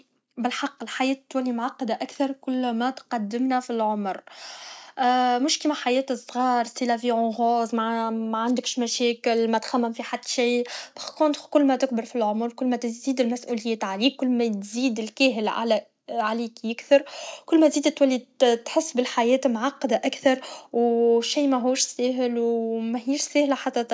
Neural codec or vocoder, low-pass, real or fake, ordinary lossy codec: none; none; real; none